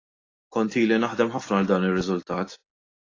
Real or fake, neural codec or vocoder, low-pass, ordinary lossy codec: real; none; 7.2 kHz; AAC, 32 kbps